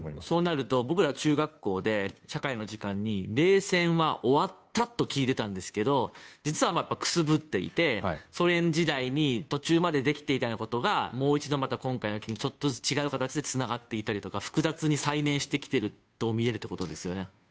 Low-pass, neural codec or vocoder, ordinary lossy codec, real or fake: none; codec, 16 kHz, 2 kbps, FunCodec, trained on Chinese and English, 25 frames a second; none; fake